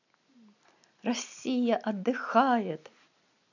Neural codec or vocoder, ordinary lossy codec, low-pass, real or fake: none; none; 7.2 kHz; real